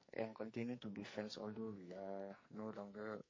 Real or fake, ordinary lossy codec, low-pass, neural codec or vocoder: fake; MP3, 32 kbps; 7.2 kHz; codec, 44.1 kHz, 2.6 kbps, SNAC